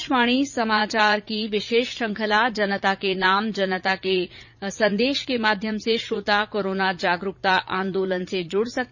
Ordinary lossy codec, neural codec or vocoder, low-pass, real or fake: none; vocoder, 44.1 kHz, 80 mel bands, Vocos; 7.2 kHz; fake